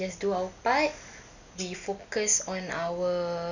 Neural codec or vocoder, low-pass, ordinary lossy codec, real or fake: none; 7.2 kHz; none; real